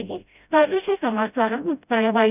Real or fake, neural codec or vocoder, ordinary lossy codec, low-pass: fake; codec, 16 kHz, 0.5 kbps, FreqCodec, smaller model; none; 3.6 kHz